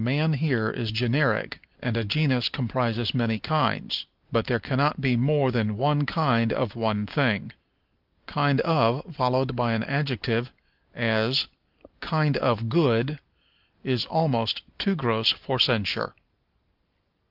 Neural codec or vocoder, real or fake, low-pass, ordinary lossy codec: none; real; 5.4 kHz; Opus, 32 kbps